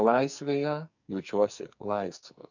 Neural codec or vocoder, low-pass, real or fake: codec, 44.1 kHz, 2.6 kbps, SNAC; 7.2 kHz; fake